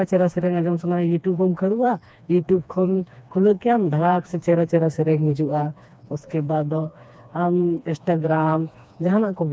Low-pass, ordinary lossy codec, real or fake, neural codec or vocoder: none; none; fake; codec, 16 kHz, 2 kbps, FreqCodec, smaller model